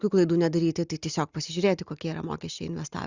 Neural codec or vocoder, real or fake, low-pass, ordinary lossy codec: none; real; 7.2 kHz; Opus, 64 kbps